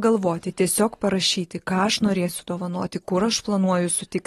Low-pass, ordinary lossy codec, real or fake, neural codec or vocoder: 19.8 kHz; AAC, 32 kbps; real; none